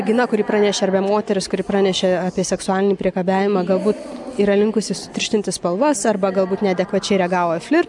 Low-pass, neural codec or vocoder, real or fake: 10.8 kHz; none; real